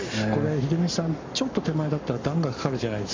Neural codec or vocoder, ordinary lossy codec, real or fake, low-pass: codec, 44.1 kHz, 7.8 kbps, Pupu-Codec; MP3, 64 kbps; fake; 7.2 kHz